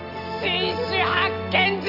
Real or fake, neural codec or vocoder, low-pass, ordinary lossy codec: real; none; 5.4 kHz; none